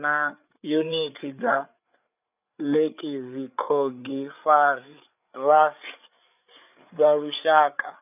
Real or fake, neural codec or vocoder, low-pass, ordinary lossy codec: fake; codec, 16 kHz, 8 kbps, FreqCodec, larger model; 3.6 kHz; none